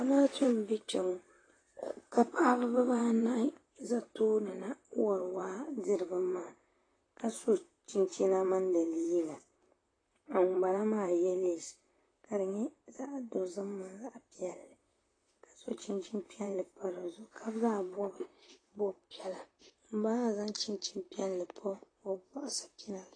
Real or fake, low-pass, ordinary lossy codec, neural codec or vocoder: fake; 9.9 kHz; AAC, 32 kbps; vocoder, 44.1 kHz, 128 mel bands, Pupu-Vocoder